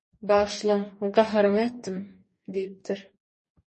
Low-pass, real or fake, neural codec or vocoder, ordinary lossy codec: 10.8 kHz; fake; codec, 44.1 kHz, 2.6 kbps, DAC; MP3, 32 kbps